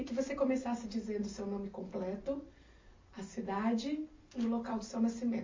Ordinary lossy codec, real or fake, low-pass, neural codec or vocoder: MP3, 32 kbps; real; 7.2 kHz; none